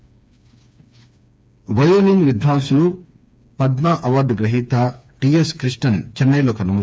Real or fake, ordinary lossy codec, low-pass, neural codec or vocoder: fake; none; none; codec, 16 kHz, 4 kbps, FreqCodec, smaller model